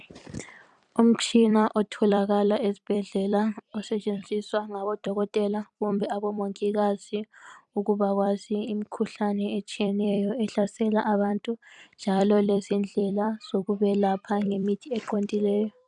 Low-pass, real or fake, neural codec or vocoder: 10.8 kHz; fake; vocoder, 44.1 kHz, 128 mel bands every 256 samples, BigVGAN v2